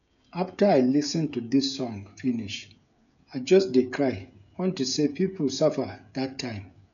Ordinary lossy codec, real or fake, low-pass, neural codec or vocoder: none; fake; 7.2 kHz; codec, 16 kHz, 16 kbps, FreqCodec, smaller model